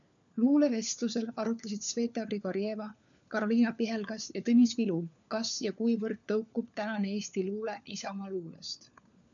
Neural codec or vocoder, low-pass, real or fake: codec, 16 kHz, 4 kbps, FunCodec, trained on LibriTTS, 50 frames a second; 7.2 kHz; fake